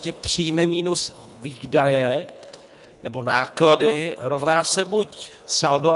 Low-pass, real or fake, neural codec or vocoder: 10.8 kHz; fake; codec, 24 kHz, 1.5 kbps, HILCodec